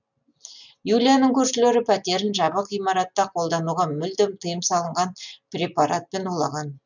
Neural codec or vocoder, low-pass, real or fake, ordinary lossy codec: none; 7.2 kHz; real; none